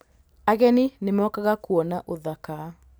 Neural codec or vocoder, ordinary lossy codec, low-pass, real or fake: none; none; none; real